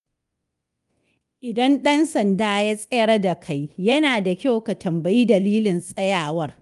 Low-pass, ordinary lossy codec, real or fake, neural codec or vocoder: 10.8 kHz; Opus, 32 kbps; fake; codec, 24 kHz, 0.9 kbps, DualCodec